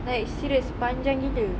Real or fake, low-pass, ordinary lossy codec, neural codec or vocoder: real; none; none; none